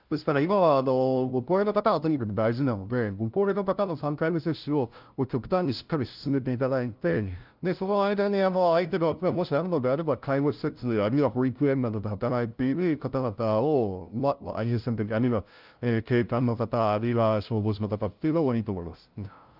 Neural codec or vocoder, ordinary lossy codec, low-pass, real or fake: codec, 16 kHz, 0.5 kbps, FunCodec, trained on LibriTTS, 25 frames a second; Opus, 24 kbps; 5.4 kHz; fake